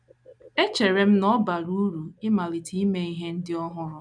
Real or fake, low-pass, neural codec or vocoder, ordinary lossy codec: real; 9.9 kHz; none; none